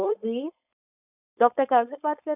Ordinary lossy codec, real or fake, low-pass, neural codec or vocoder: none; fake; 3.6 kHz; codec, 16 kHz, 4.8 kbps, FACodec